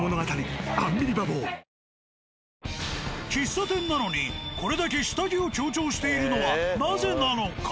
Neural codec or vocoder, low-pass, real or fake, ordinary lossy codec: none; none; real; none